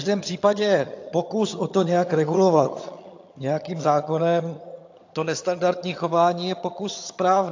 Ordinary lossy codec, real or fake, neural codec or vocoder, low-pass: AAC, 48 kbps; fake; vocoder, 22.05 kHz, 80 mel bands, HiFi-GAN; 7.2 kHz